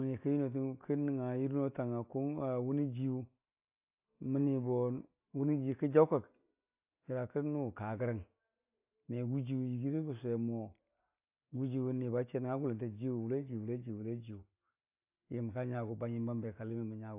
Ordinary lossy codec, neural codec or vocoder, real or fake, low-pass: AAC, 32 kbps; none; real; 3.6 kHz